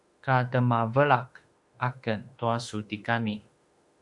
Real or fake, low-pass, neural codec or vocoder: fake; 10.8 kHz; autoencoder, 48 kHz, 32 numbers a frame, DAC-VAE, trained on Japanese speech